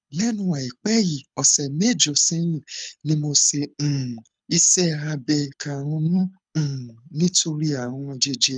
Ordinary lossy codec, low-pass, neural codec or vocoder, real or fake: none; 9.9 kHz; codec, 24 kHz, 6 kbps, HILCodec; fake